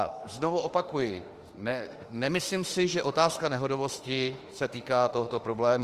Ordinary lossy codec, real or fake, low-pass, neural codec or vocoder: Opus, 24 kbps; fake; 14.4 kHz; autoencoder, 48 kHz, 32 numbers a frame, DAC-VAE, trained on Japanese speech